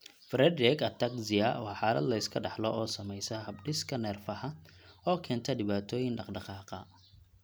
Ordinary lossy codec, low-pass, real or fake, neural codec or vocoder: none; none; real; none